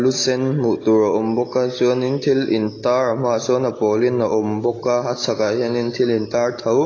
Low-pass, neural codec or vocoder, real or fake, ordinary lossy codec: 7.2 kHz; none; real; AAC, 32 kbps